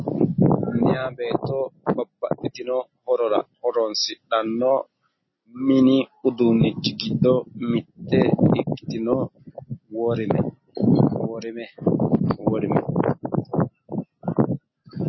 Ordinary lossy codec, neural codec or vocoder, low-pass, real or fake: MP3, 24 kbps; none; 7.2 kHz; real